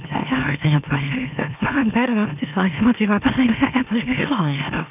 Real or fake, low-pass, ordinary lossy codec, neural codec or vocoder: fake; 3.6 kHz; none; autoencoder, 44.1 kHz, a latent of 192 numbers a frame, MeloTTS